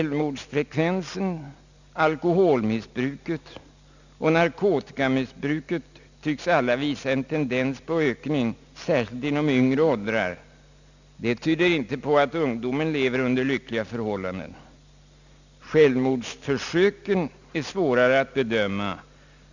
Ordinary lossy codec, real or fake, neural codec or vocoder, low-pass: none; real; none; 7.2 kHz